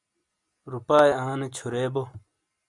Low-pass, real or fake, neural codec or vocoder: 10.8 kHz; real; none